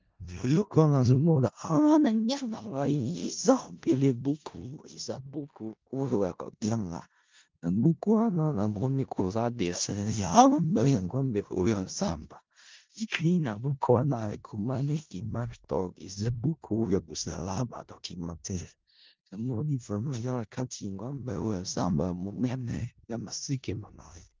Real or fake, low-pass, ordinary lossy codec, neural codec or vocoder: fake; 7.2 kHz; Opus, 24 kbps; codec, 16 kHz in and 24 kHz out, 0.4 kbps, LongCat-Audio-Codec, four codebook decoder